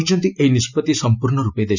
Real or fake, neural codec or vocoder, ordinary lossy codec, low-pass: real; none; none; 7.2 kHz